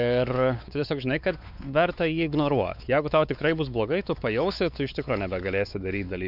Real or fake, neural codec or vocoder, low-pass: fake; codec, 16 kHz, 4 kbps, X-Codec, WavLM features, trained on Multilingual LibriSpeech; 5.4 kHz